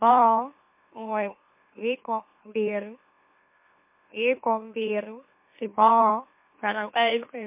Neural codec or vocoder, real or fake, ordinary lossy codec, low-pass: autoencoder, 44.1 kHz, a latent of 192 numbers a frame, MeloTTS; fake; MP3, 24 kbps; 3.6 kHz